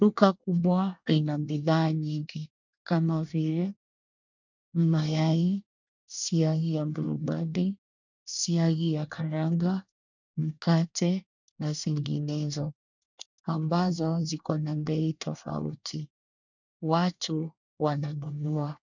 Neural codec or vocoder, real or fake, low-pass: codec, 24 kHz, 1 kbps, SNAC; fake; 7.2 kHz